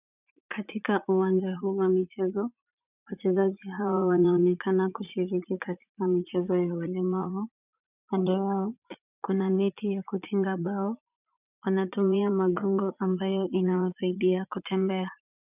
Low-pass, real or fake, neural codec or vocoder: 3.6 kHz; fake; vocoder, 44.1 kHz, 80 mel bands, Vocos